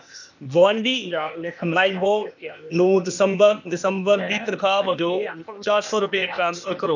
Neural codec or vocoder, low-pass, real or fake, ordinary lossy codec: codec, 16 kHz, 0.8 kbps, ZipCodec; 7.2 kHz; fake; none